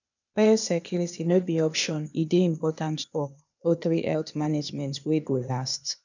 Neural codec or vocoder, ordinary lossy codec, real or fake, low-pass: codec, 16 kHz, 0.8 kbps, ZipCodec; none; fake; 7.2 kHz